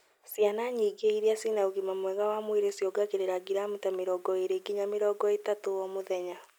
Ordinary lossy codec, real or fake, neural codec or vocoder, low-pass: none; real; none; none